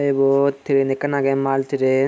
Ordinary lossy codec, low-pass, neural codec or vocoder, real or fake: none; none; none; real